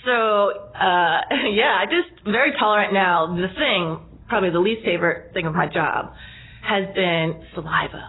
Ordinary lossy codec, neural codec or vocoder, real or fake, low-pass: AAC, 16 kbps; none; real; 7.2 kHz